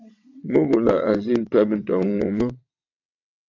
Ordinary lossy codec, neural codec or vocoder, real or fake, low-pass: AAC, 48 kbps; vocoder, 22.05 kHz, 80 mel bands, WaveNeXt; fake; 7.2 kHz